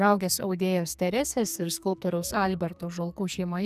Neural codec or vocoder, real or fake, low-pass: codec, 44.1 kHz, 2.6 kbps, SNAC; fake; 14.4 kHz